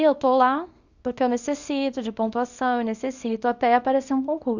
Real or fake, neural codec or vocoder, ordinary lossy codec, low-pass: fake; codec, 24 kHz, 0.9 kbps, WavTokenizer, small release; Opus, 64 kbps; 7.2 kHz